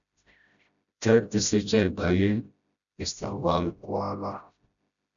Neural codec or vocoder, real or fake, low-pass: codec, 16 kHz, 0.5 kbps, FreqCodec, smaller model; fake; 7.2 kHz